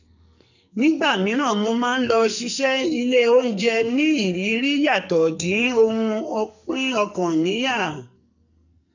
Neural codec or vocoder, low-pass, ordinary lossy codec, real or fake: codec, 44.1 kHz, 2.6 kbps, SNAC; 7.2 kHz; none; fake